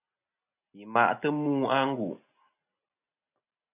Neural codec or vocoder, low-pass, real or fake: none; 3.6 kHz; real